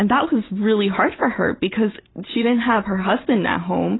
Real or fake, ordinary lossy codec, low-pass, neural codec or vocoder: real; AAC, 16 kbps; 7.2 kHz; none